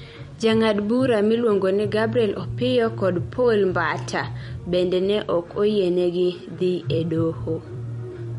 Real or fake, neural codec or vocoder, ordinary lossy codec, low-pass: fake; vocoder, 44.1 kHz, 128 mel bands every 256 samples, BigVGAN v2; MP3, 48 kbps; 19.8 kHz